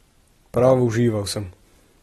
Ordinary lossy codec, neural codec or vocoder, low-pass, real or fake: AAC, 32 kbps; none; 14.4 kHz; real